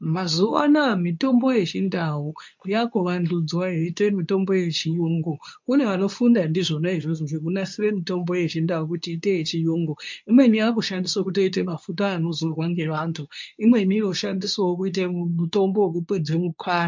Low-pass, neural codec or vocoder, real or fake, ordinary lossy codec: 7.2 kHz; codec, 24 kHz, 0.9 kbps, WavTokenizer, medium speech release version 2; fake; MP3, 64 kbps